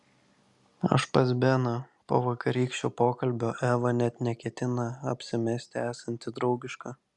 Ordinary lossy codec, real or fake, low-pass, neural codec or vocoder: Opus, 64 kbps; real; 10.8 kHz; none